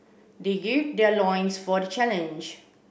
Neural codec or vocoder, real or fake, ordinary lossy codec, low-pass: none; real; none; none